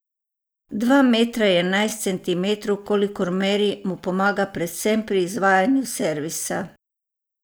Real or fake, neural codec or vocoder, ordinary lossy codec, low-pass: real; none; none; none